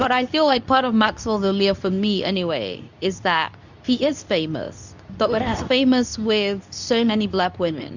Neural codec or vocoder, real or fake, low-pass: codec, 24 kHz, 0.9 kbps, WavTokenizer, medium speech release version 2; fake; 7.2 kHz